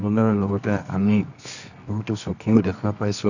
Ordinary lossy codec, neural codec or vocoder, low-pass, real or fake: none; codec, 24 kHz, 0.9 kbps, WavTokenizer, medium music audio release; 7.2 kHz; fake